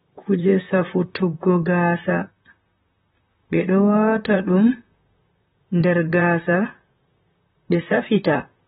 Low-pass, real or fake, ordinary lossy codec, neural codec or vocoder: 19.8 kHz; fake; AAC, 16 kbps; vocoder, 44.1 kHz, 128 mel bands, Pupu-Vocoder